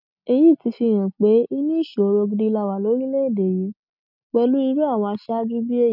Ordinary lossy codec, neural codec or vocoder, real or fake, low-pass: none; none; real; 5.4 kHz